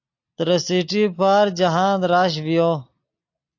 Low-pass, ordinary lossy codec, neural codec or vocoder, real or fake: 7.2 kHz; AAC, 48 kbps; none; real